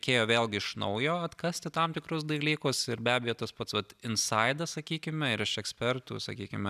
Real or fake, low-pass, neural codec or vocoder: real; 14.4 kHz; none